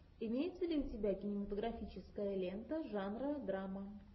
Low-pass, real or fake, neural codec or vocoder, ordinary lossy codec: 7.2 kHz; real; none; MP3, 24 kbps